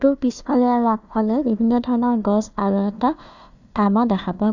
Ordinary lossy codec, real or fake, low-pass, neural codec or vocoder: none; fake; 7.2 kHz; codec, 16 kHz, 1 kbps, FunCodec, trained on Chinese and English, 50 frames a second